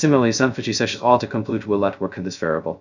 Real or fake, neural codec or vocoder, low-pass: fake; codec, 16 kHz, 0.2 kbps, FocalCodec; 7.2 kHz